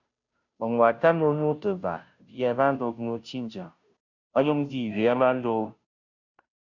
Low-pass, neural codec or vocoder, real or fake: 7.2 kHz; codec, 16 kHz, 0.5 kbps, FunCodec, trained on Chinese and English, 25 frames a second; fake